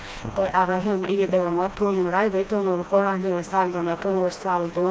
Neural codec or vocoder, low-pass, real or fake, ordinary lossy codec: codec, 16 kHz, 1 kbps, FreqCodec, smaller model; none; fake; none